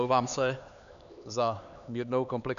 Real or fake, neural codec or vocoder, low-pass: fake; codec, 16 kHz, 4 kbps, X-Codec, HuBERT features, trained on LibriSpeech; 7.2 kHz